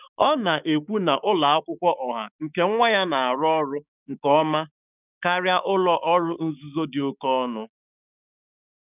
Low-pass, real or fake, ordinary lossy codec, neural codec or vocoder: 3.6 kHz; fake; none; codec, 16 kHz, 6 kbps, DAC